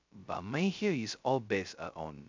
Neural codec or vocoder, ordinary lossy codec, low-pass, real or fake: codec, 16 kHz, 0.2 kbps, FocalCodec; MP3, 64 kbps; 7.2 kHz; fake